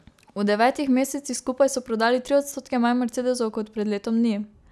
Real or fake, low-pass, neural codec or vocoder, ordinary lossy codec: real; none; none; none